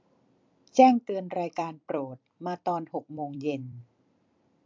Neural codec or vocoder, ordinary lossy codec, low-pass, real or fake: vocoder, 44.1 kHz, 128 mel bands every 256 samples, BigVGAN v2; MP3, 64 kbps; 7.2 kHz; fake